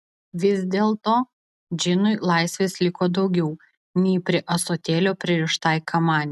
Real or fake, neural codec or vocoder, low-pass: real; none; 14.4 kHz